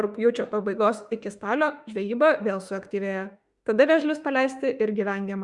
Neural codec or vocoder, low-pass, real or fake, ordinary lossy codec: autoencoder, 48 kHz, 32 numbers a frame, DAC-VAE, trained on Japanese speech; 10.8 kHz; fake; Opus, 64 kbps